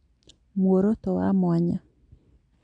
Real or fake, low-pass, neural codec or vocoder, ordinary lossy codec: real; 9.9 kHz; none; none